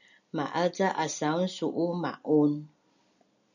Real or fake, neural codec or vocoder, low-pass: real; none; 7.2 kHz